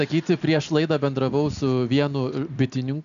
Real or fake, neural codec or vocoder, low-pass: real; none; 7.2 kHz